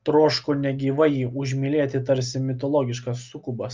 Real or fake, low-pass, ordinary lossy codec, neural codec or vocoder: real; 7.2 kHz; Opus, 24 kbps; none